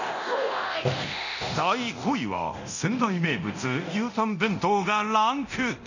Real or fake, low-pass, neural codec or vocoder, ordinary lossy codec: fake; 7.2 kHz; codec, 24 kHz, 0.9 kbps, DualCodec; none